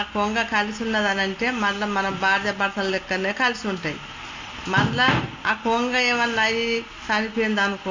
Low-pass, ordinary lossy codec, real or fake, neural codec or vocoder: 7.2 kHz; MP3, 64 kbps; real; none